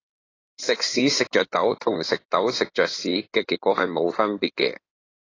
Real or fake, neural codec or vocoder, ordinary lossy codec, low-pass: fake; codec, 16 kHz in and 24 kHz out, 2.2 kbps, FireRedTTS-2 codec; AAC, 32 kbps; 7.2 kHz